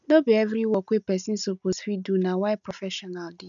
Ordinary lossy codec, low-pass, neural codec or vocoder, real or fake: none; 7.2 kHz; none; real